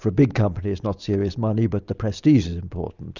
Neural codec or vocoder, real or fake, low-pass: none; real; 7.2 kHz